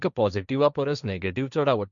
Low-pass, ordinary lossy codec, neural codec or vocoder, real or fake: 7.2 kHz; none; codec, 16 kHz, 1.1 kbps, Voila-Tokenizer; fake